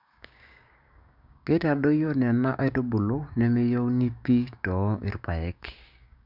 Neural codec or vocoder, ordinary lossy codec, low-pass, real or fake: codec, 16 kHz, 6 kbps, DAC; none; 5.4 kHz; fake